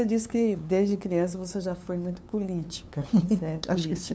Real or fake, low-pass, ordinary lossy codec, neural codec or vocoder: fake; none; none; codec, 16 kHz, 2 kbps, FunCodec, trained on LibriTTS, 25 frames a second